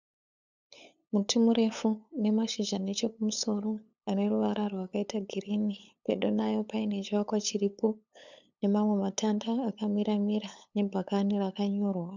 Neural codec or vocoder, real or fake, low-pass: codec, 16 kHz, 8 kbps, FunCodec, trained on LibriTTS, 25 frames a second; fake; 7.2 kHz